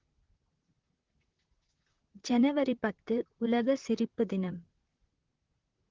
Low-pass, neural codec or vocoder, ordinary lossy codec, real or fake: 7.2 kHz; vocoder, 44.1 kHz, 128 mel bands, Pupu-Vocoder; Opus, 16 kbps; fake